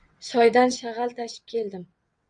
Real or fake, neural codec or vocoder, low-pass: fake; vocoder, 22.05 kHz, 80 mel bands, WaveNeXt; 9.9 kHz